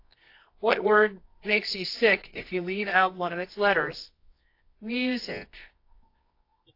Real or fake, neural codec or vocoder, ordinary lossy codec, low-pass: fake; codec, 24 kHz, 0.9 kbps, WavTokenizer, medium music audio release; AAC, 32 kbps; 5.4 kHz